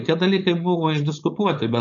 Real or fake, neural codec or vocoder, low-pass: fake; codec, 16 kHz, 4.8 kbps, FACodec; 7.2 kHz